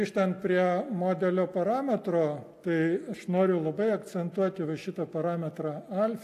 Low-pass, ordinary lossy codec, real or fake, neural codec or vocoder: 14.4 kHz; AAC, 64 kbps; real; none